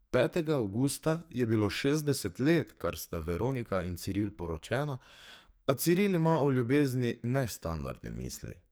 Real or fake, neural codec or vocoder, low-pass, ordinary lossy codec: fake; codec, 44.1 kHz, 2.6 kbps, SNAC; none; none